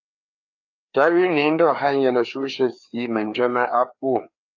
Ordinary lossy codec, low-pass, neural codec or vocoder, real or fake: AAC, 48 kbps; 7.2 kHz; codec, 16 kHz, 2 kbps, FreqCodec, larger model; fake